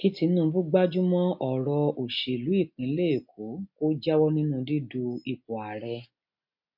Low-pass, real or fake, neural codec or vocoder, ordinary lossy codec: 5.4 kHz; real; none; MP3, 32 kbps